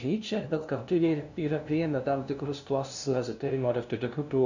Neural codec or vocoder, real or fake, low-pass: codec, 16 kHz, 0.5 kbps, FunCodec, trained on LibriTTS, 25 frames a second; fake; 7.2 kHz